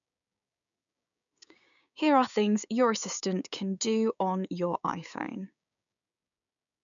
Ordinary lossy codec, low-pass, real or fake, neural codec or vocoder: none; 7.2 kHz; fake; codec, 16 kHz, 6 kbps, DAC